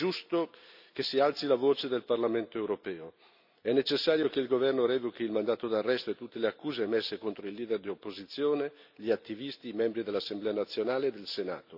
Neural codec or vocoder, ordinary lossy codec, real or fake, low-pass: none; none; real; 5.4 kHz